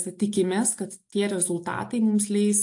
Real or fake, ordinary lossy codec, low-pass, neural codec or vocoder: real; AAC, 64 kbps; 10.8 kHz; none